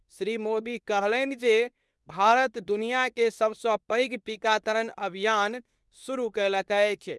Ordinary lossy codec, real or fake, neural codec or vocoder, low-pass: none; fake; codec, 24 kHz, 0.9 kbps, WavTokenizer, medium speech release version 2; none